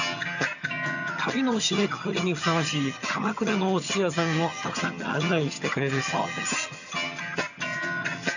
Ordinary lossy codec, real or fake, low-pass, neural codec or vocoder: none; fake; 7.2 kHz; vocoder, 22.05 kHz, 80 mel bands, HiFi-GAN